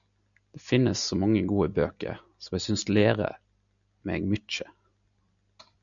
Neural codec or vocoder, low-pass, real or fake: none; 7.2 kHz; real